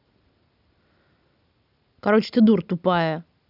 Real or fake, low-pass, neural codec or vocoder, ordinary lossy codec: real; 5.4 kHz; none; none